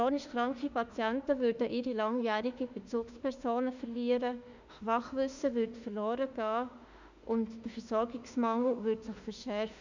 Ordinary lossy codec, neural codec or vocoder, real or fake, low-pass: none; autoencoder, 48 kHz, 32 numbers a frame, DAC-VAE, trained on Japanese speech; fake; 7.2 kHz